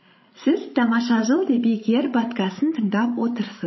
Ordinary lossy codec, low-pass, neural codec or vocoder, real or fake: MP3, 24 kbps; 7.2 kHz; codec, 16 kHz, 16 kbps, FreqCodec, larger model; fake